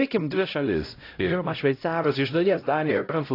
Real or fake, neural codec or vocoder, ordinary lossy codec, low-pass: fake; codec, 16 kHz, 0.5 kbps, X-Codec, HuBERT features, trained on LibriSpeech; AAC, 32 kbps; 5.4 kHz